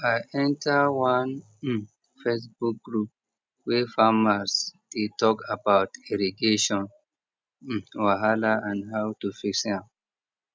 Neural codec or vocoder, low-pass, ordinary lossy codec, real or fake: none; none; none; real